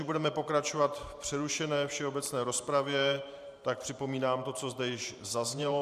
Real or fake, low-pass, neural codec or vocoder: fake; 14.4 kHz; vocoder, 44.1 kHz, 128 mel bands every 512 samples, BigVGAN v2